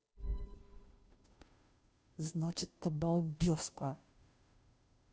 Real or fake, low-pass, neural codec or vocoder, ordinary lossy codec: fake; none; codec, 16 kHz, 0.5 kbps, FunCodec, trained on Chinese and English, 25 frames a second; none